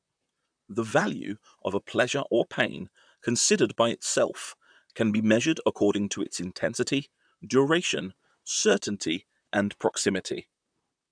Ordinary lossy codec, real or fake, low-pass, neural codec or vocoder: none; fake; 9.9 kHz; vocoder, 44.1 kHz, 128 mel bands, Pupu-Vocoder